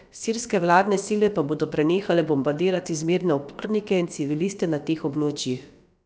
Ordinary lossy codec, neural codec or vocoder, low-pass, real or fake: none; codec, 16 kHz, about 1 kbps, DyCAST, with the encoder's durations; none; fake